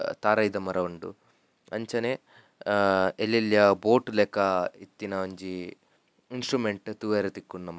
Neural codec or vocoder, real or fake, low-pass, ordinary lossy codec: none; real; none; none